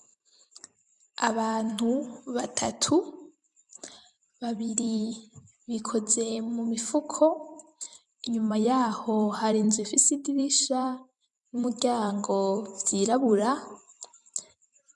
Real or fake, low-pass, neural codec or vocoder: fake; 10.8 kHz; vocoder, 44.1 kHz, 128 mel bands every 256 samples, BigVGAN v2